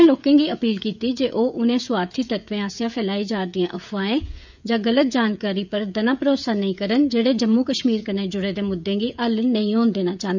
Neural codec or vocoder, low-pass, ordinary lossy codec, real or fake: codec, 16 kHz, 16 kbps, FreqCodec, smaller model; 7.2 kHz; none; fake